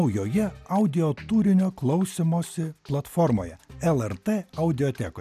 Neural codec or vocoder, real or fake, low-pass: none; real; 14.4 kHz